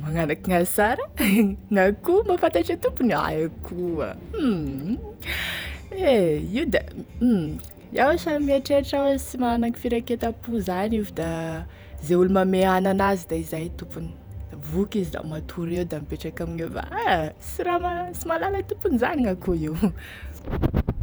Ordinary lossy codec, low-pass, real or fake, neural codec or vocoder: none; none; fake; vocoder, 48 kHz, 128 mel bands, Vocos